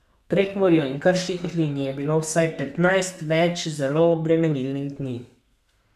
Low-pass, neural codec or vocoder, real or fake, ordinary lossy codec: 14.4 kHz; codec, 32 kHz, 1.9 kbps, SNAC; fake; none